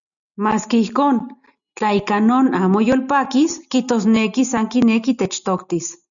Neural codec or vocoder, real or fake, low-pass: none; real; 7.2 kHz